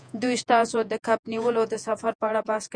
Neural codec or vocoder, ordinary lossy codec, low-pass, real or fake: vocoder, 48 kHz, 128 mel bands, Vocos; Opus, 64 kbps; 9.9 kHz; fake